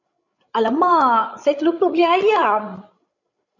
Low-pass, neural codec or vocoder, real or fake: 7.2 kHz; codec, 16 kHz, 16 kbps, FreqCodec, larger model; fake